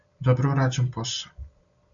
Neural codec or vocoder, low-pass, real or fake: none; 7.2 kHz; real